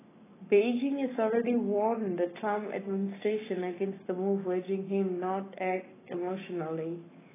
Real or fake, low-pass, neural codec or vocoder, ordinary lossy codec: fake; 3.6 kHz; codec, 44.1 kHz, 7.8 kbps, Pupu-Codec; AAC, 16 kbps